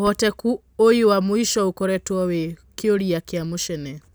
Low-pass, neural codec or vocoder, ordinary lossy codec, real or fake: none; none; none; real